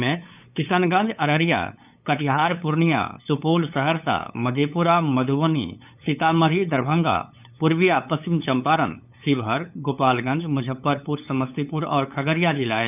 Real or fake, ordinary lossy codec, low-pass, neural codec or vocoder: fake; none; 3.6 kHz; codec, 16 kHz, 8 kbps, FunCodec, trained on LibriTTS, 25 frames a second